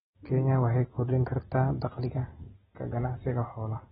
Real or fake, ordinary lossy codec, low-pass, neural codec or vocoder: real; AAC, 16 kbps; 14.4 kHz; none